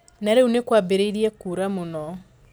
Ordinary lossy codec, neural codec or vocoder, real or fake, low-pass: none; none; real; none